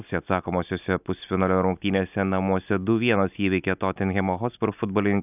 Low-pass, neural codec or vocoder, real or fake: 3.6 kHz; none; real